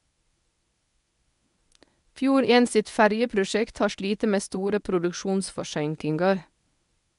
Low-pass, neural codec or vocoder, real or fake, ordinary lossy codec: 10.8 kHz; codec, 24 kHz, 0.9 kbps, WavTokenizer, medium speech release version 1; fake; none